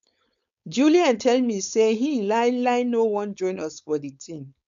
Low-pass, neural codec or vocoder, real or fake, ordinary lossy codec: 7.2 kHz; codec, 16 kHz, 4.8 kbps, FACodec; fake; none